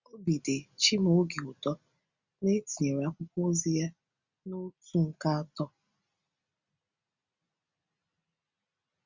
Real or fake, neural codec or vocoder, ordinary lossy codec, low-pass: real; none; Opus, 64 kbps; 7.2 kHz